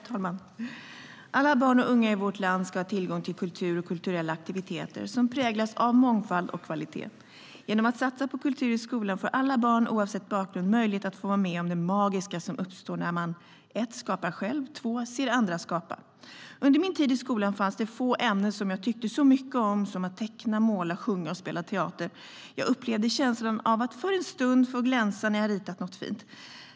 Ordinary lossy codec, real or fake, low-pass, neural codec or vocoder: none; real; none; none